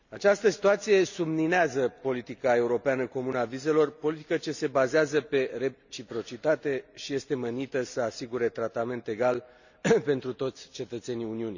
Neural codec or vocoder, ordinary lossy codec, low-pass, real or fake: none; none; 7.2 kHz; real